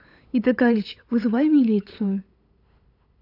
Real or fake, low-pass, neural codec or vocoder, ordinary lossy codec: fake; 5.4 kHz; codec, 16 kHz, 8 kbps, FunCodec, trained on LibriTTS, 25 frames a second; AAC, 32 kbps